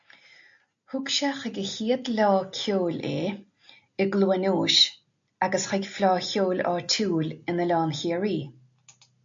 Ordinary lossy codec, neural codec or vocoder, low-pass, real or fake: AAC, 64 kbps; none; 7.2 kHz; real